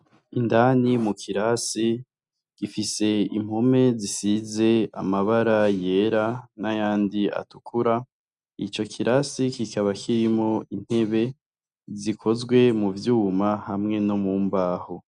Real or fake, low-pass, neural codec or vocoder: real; 10.8 kHz; none